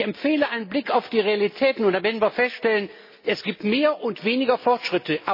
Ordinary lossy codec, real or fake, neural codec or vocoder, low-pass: MP3, 24 kbps; real; none; 5.4 kHz